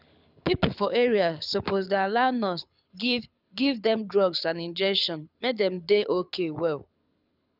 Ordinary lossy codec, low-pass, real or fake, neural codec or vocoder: none; 5.4 kHz; fake; codec, 24 kHz, 6 kbps, HILCodec